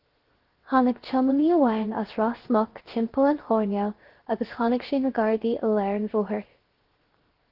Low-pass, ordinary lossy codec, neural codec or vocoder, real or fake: 5.4 kHz; Opus, 16 kbps; codec, 16 kHz, 0.7 kbps, FocalCodec; fake